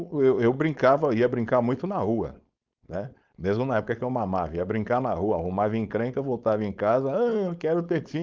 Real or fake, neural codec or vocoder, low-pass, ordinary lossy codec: fake; codec, 16 kHz, 4.8 kbps, FACodec; 7.2 kHz; Opus, 32 kbps